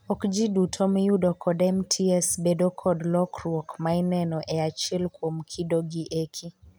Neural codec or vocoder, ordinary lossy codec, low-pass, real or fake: none; none; none; real